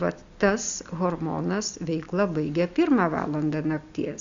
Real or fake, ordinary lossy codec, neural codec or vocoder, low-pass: real; Opus, 64 kbps; none; 7.2 kHz